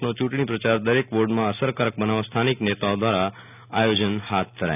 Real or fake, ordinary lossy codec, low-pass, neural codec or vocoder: real; none; 3.6 kHz; none